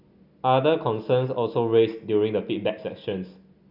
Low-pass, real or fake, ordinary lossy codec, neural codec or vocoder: 5.4 kHz; real; none; none